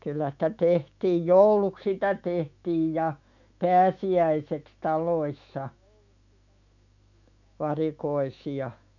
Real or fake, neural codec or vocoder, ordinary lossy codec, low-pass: fake; autoencoder, 48 kHz, 128 numbers a frame, DAC-VAE, trained on Japanese speech; none; 7.2 kHz